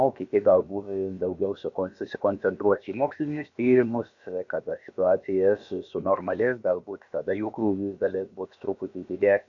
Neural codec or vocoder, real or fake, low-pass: codec, 16 kHz, about 1 kbps, DyCAST, with the encoder's durations; fake; 7.2 kHz